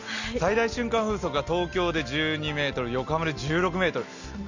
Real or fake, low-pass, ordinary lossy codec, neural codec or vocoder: real; 7.2 kHz; none; none